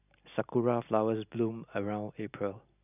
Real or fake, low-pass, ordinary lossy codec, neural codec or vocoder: real; 3.6 kHz; none; none